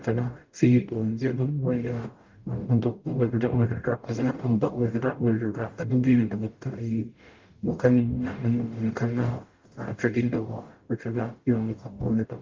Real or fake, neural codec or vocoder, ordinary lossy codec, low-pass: fake; codec, 44.1 kHz, 0.9 kbps, DAC; Opus, 32 kbps; 7.2 kHz